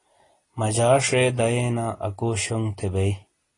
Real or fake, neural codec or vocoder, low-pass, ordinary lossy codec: real; none; 10.8 kHz; AAC, 32 kbps